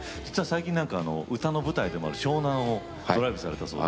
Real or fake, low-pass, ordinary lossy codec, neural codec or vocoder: real; none; none; none